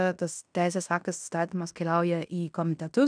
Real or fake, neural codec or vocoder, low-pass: fake; codec, 16 kHz in and 24 kHz out, 0.9 kbps, LongCat-Audio-Codec, fine tuned four codebook decoder; 9.9 kHz